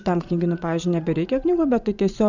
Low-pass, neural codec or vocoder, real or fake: 7.2 kHz; vocoder, 44.1 kHz, 80 mel bands, Vocos; fake